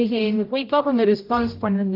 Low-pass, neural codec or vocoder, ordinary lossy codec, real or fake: 5.4 kHz; codec, 16 kHz, 0.5 kbps, X-Codec, HuBERT features, trained on general audio; Opus, 24 kbps; fake